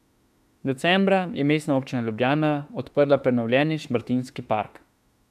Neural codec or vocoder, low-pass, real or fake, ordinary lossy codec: autoencoder, 48 kHz, 32 numbers a frame, DAC-VAE, trained on Japanese speech; 14.4 kHz; fake; AAC, 96 kbps